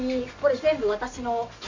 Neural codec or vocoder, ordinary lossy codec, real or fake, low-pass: none; MP3, 64 kbps; real; 7.2 kHz